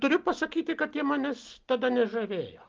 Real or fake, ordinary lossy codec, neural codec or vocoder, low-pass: real; Opus, 32 kbps; none; 7.2 kHz